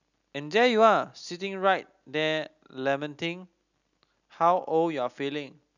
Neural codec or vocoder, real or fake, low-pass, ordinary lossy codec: none; real; 7.2 kHz; none